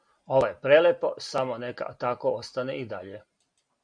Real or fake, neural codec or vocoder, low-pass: real; none; 9.9 kHz